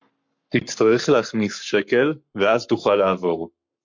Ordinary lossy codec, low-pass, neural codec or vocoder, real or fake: MP3, 48 kbps; 7.2 kHz; codec, 44.1 kHz, 7.8 kbps, Pupu-Codec; fake